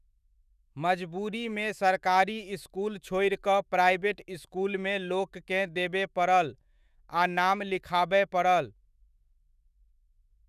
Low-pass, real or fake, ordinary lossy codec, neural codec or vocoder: 14.4 kHz; fake; none; autoencoder, 48 kHz, 128 numbers a frame, DAC-VAE, trained on Japanese speech